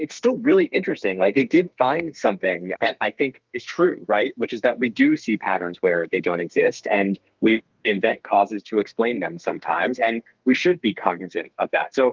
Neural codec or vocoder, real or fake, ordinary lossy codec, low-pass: codec, 32 kHz, 1.9 kbps, SNAC; fake; Opus, 32 kbps; 7.2 kHz